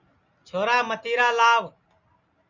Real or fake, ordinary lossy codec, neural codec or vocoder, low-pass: real; Opus, 64 kbps; none; 7.2 kHz